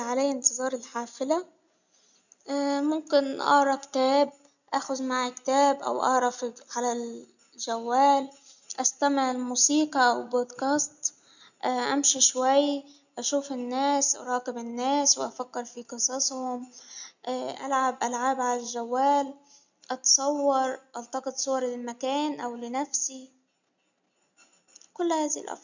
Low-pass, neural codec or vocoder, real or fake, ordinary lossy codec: 7.2 kHz; none; real; none